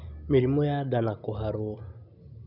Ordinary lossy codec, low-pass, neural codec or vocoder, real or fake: none; 5.4 kHz; none; real